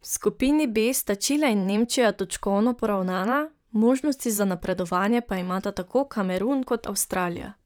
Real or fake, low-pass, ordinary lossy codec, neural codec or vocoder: fake; none; none; vocoder, 44.1 kHz, 128 mel bands, Pupu-Vocoder